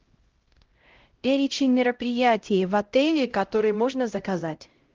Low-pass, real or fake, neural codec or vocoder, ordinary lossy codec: 7.2 kHz; fake; codec, 16 kHz, 0.5 kbps, X-Codec, HuBERT features, trained on LibriSpeech; Opus, 16 kbps